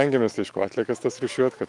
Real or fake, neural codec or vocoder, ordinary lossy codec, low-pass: fake; autoencoder, 48 kHz, 128 numbers a frame, DAC-VAE, trained on Japanese speech; Opus, 32 kbps; 10.8 kHz